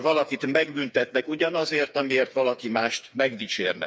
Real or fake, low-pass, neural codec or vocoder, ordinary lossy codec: fake; none; codec, 16 kHz, 4 kbps, FreqCodec, smaller model; none